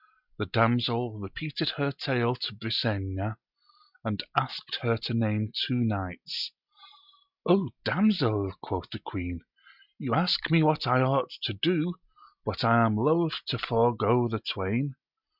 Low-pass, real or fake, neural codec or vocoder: 5.4 kHz; real; none